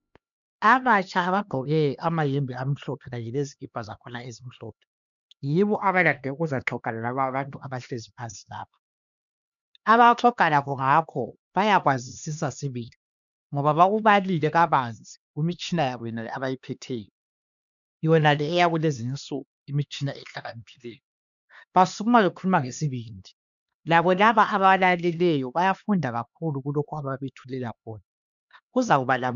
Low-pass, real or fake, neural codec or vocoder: 7.2 kHz; fake; codec, 16 kHz, 2 kbps, X-Codec, HuBERT features, trained on LibriSpeech